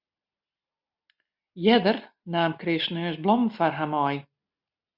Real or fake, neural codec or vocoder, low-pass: real; none; 5.4 kHz